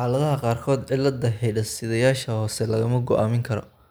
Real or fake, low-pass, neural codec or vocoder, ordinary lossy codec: real; none; none; none